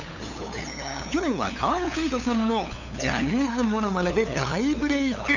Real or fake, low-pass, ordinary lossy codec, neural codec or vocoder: fake; 7.2 kHz; none; codec, 16 kHz, 8 kbps, FunCodec, trained on LibriTTS, 25 frames a second